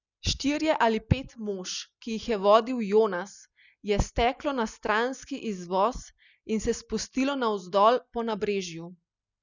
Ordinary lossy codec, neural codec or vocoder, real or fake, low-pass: none; none; real; 7.2 kHz